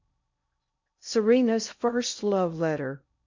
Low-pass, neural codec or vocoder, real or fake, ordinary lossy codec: 7.2 kHz; codec, 16 kHz in and 24 kHz out, 0.6 kbps, FocalCodec, streaming, 2048 codes; fake; MP3, 64 kbps